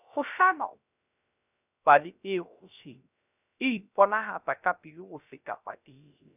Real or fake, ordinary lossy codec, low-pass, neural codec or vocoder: fake; none; 3.6 kHz; codec, 16 kHz, 0.3 kbps, FocalCodec